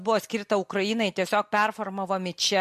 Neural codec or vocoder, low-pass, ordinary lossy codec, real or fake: none; 14.4 kHz; MP3, 64 kbps; real